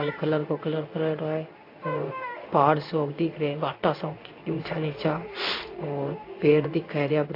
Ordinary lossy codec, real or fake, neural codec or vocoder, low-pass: AAC, 32 kbps; fake; codec, 16 kHz in and 24 kHz out, 1 kbps, XY-Tokenizer; 5.4 kHz